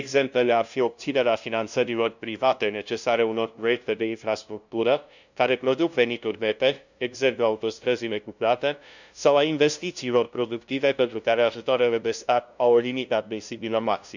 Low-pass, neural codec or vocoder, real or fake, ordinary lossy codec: 7.2 kHz; codec, 16 kHz, 0.5 kbps, FunCodec, trained on LibriTTS, 25 frames a second; fake; none